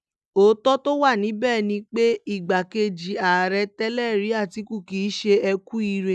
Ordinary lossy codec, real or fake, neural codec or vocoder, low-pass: none; real; none; none